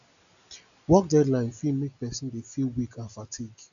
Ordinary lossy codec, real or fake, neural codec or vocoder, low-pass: none; real; none; 7.2 kHz